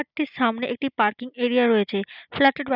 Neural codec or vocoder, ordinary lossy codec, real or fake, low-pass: none; none; real; 5.4 kHz